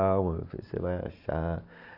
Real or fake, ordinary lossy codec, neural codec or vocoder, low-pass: real; Opus, 64 kbps; none; 5.4 kHz